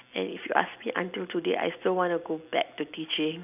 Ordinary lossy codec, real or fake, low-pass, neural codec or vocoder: none; real; 3.6 kHz; none